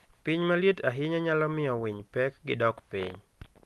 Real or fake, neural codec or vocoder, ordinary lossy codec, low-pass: real; none; Opus, 24 kbps; 14.4 kHz